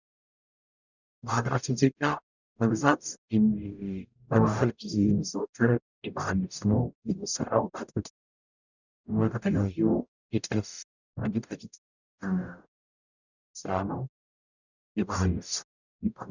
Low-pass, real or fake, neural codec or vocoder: 7.2 kHz; fake; codec, 44.1 kHz, 0.9 kbps, DAC